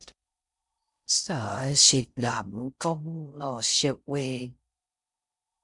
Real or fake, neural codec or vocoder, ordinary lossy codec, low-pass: fake; codec, 16 kHz in and 24 kHz out, 0.6 kbps, FocalCodec, streaming, 4096 codes; MP3, 96 kbps; 10.8 kHz